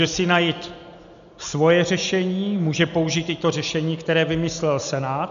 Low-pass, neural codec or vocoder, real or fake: 7.2 kHz; none; real